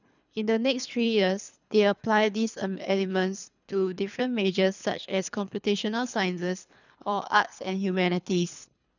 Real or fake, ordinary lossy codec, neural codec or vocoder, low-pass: fake; none; codec, 24 kHz, 3 kbps, HILCodec; 7.2 kHz